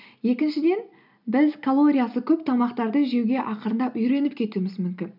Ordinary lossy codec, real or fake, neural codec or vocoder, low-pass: none; real; none; 5.4 kHz